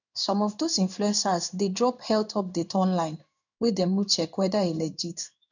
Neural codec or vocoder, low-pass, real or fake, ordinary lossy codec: codec, 16 kHz in and 24 kHz out, 1 kbps, XY-Tokenizer; 7.2 kHz; fake; none